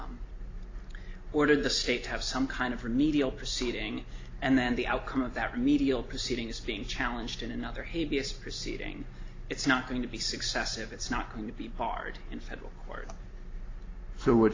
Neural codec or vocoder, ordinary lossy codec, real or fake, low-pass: none; AAC, 32 kbps; real; 7.2 kHz